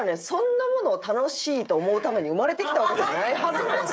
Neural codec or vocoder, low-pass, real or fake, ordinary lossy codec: codec, 16 kHz, 16 kbps, FreqCodec, smaller model; none; fake; none